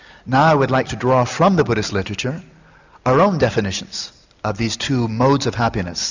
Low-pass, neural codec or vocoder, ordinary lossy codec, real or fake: 7.2 kHz; none; Opus, 64 kbps; real